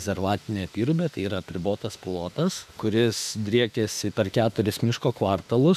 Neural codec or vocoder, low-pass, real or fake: autoencoder, 48 kHz, 32 numbers a frame, DAC-VAE, trained on Japanese speech; 14.4 kHz; fake